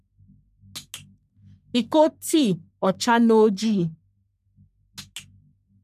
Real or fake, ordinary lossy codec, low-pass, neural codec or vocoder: fake; none; 14.4 kHz; codec, 44.1 kHz, 3.4 kbps, Pupu-Codec